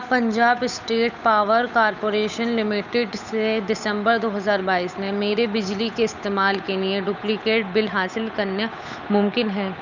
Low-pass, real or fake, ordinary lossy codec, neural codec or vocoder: 7.2 kHz; fake; none; codec, 16 kHz, 8 kbps, FunCodec, trained on Chinese and English, 25 frames a second